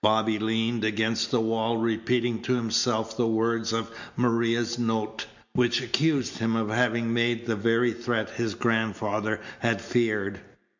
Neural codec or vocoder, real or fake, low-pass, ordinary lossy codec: none; real; 7.2 kHz; MP3, 64 kbps